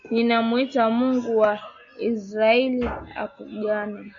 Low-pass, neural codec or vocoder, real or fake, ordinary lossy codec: 7.2 kHz; none; real; AAC, 64 kbps